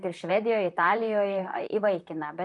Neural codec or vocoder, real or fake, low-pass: vocoder, 44.1 kHz, 128 mel bands, Pupu-Vocoder; fake; 10.8 kHz